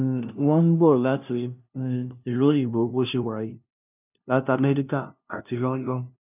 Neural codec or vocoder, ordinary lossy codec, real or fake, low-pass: codec, 16 kHz, 0.5 kbps, FunCodec, trained on LibriTTS, 25 frames a second; none; fake; 3.6 kHz